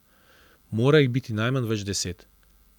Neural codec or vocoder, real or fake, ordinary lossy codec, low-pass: none; real; none; 19.8 kHz